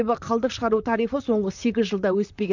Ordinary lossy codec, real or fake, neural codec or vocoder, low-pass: none; fake; vocoder, 44.1 kHz, 128 mel bands, Pupu-Vocoder; 7.2 kHz